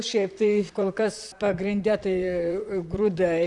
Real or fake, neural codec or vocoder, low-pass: fake; vocoder, 44.1 kHz, 128 mel bands, Pupu-Vocoder; 10.8 kHz